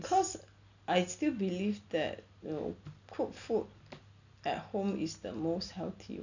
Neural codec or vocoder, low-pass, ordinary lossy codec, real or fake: none; 7.2 kHz; none; real